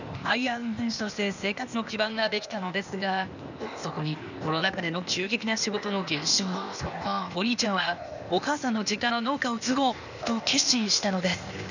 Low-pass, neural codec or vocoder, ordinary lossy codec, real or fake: 7.2 kHz; codec, 16 kHz, 0.8 kbps, ZipCodec; none; fake